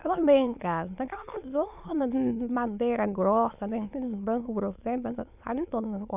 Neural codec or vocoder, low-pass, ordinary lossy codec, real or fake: autoencoder, 22.05 kHz, a latent of 192 numbers a frame, VITS, trained on many speakers; 3.6 kHz; none; fake